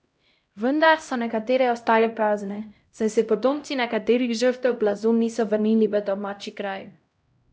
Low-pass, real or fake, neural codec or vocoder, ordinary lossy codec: none; fake; codec, 16 kHz, 0.5 kbps, X-Codec, HuBERT features, trained on LibriSpeech; none